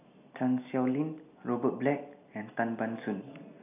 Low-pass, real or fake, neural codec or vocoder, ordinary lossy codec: 3.6 kHz; real; none; none